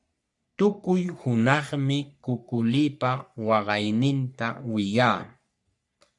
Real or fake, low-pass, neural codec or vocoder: fake; 10.8 kHz; codec, 44.1 kHz, 3.4 kbps, Pupu-Codec